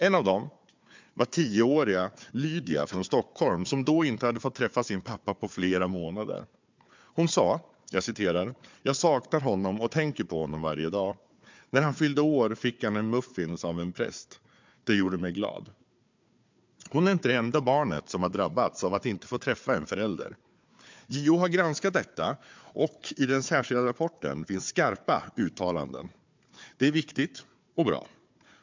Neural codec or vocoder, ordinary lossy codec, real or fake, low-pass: codec, 16 kHz, 4 kbps, FunCodec, trained on Chinese and English, 50 frames a second; MP3, 64 kbps; fake; 7.2 kHz